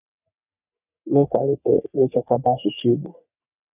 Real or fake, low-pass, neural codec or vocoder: fake; 3.6 kHz; codec, 44.1 kHz, 3.4 kbps, Pupu-Codec